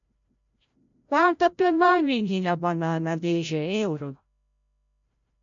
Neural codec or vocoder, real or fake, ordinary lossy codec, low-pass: codec, 16 kHz, 0.5 kbps, FreqCodec, larger model; fake; MP3, 64 kbps; 7.2 kHz